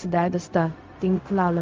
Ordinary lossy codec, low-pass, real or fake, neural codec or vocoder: Opus, 24 kbps; 7.2 kHz; fake; codec, 16 kHz, 0.4 kbps, LongCat-Audio-Codec